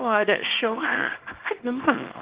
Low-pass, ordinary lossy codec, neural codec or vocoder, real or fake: 3.6 kHz; Opus, 16 kbps; codec, 24 kHz, 0.9 kbps, WavTokenizer, small release; fake